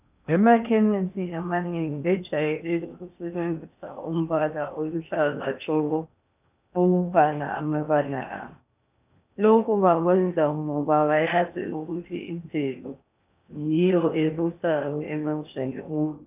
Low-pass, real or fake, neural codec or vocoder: 3.6 kHz; fake; codec, 16 kHz in and 24 kHz out, 0.8 kbps, FocalCodec, streaming, 65536 codes